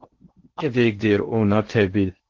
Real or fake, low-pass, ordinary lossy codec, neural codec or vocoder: fake; 7.2 kHz; Opus, 16 kbps; codec, 16 kHz in and 24 kHz out, 0.6 kbps, FocalCodec, streaming, 4096 codes